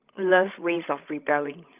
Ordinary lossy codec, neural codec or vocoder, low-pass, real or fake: Opus, 24 kbps; codec, 16 kHz, 16 kbps, FreqCodec, larger model; 3.6 kHz; fake